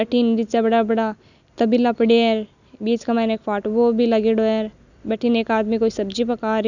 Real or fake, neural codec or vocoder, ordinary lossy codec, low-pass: real; none; none; 7.2 kHz